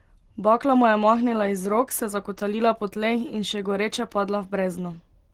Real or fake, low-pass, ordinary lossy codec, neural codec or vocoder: real; 19.8 kHz; Opus, 16 kbps; none